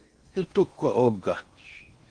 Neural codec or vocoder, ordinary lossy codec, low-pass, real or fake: codec, 16 kHz in and 24 kHz out, 0.6 kbps, FocalCodec, streaming, 4096 codes; Opus, 24 kbps; 9.9 kHz; fake